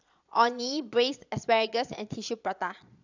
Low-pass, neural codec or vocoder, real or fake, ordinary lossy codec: 7.2 kHz; vocoder, 22.05 kHz, 80 mel bands, Vocos; fake; none